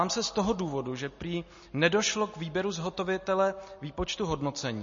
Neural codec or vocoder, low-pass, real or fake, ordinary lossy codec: none; 7.2 kHz; real; MP3, 32 kbps